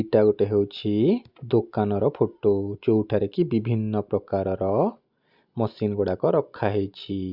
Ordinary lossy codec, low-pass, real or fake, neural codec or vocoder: Opus, 64 kbps; 5.4 kHz; real; none